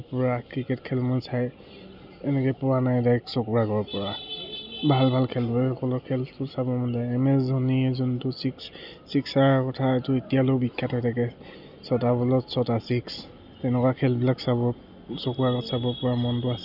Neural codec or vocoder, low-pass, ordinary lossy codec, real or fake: none; 5.4 kHz; none; real